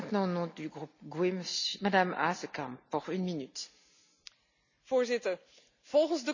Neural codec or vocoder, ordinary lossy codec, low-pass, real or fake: none; none; 7.2 kHz; real